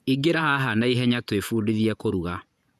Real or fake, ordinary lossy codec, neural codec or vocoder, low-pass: fake; none; vocoder, 48 kHz, 128 mel bands, Vocos; 14.4 kHz